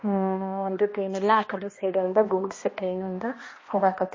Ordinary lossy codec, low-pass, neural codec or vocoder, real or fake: MP3, 32 kbps; 7.2 kHz; codec, 16 kHz, 1 kbps, X-Codec, HuBERT features, trained on balanced general audio; fake